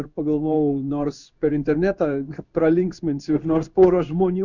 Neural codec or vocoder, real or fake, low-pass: codec, 16 kHz in and 24 kHz out, 1 kbps, XY-Tokenizer; fake; 7.2 kHz